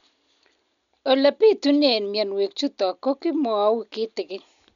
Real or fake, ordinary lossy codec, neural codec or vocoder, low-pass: real; none; none; 7.2 kHz